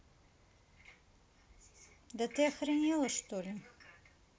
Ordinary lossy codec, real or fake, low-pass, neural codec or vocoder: none; real; none; none